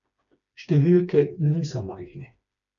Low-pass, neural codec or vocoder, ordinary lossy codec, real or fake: 7.2 kHz; codec, 16 kHz, 2 kbps, FreqCodec, smaller model; none; fake